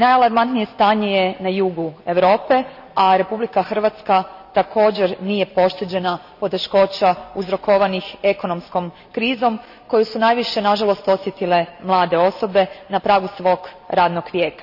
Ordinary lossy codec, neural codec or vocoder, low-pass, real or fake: none; none; 5.4 kHz; real